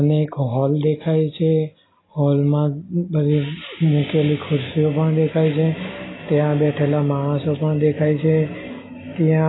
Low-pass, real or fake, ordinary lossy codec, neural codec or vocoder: 7.2 kHz; real; AAC, 16 kbps; none